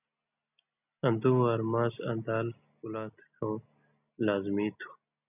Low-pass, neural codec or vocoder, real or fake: 3.6 kHz; none; real